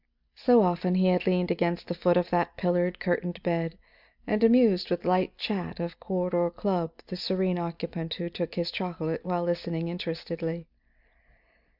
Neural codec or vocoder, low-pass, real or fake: none; 5.4 kHz; real